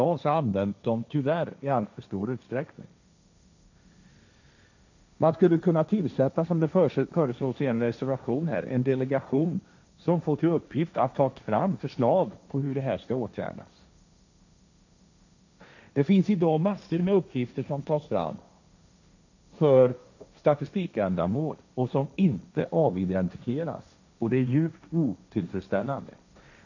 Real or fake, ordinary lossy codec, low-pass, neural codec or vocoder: fake; none; 7.2 kHz; codec, 16 kHz, 1.1 kbps, Voila-Tokenizer